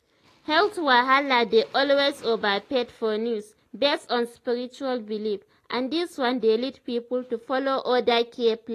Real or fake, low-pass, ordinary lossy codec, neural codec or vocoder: real; 14.4 kHz; AAC, 48 kbps; none